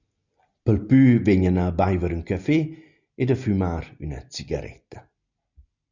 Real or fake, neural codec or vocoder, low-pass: real; none; 7.2 kHz